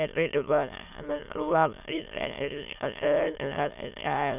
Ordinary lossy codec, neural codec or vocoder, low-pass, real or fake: none; autoencoder, 22.05 kHz, a latent of 192 numbers a frame, VITS, trained on many speakers; 3.6 kHz; fake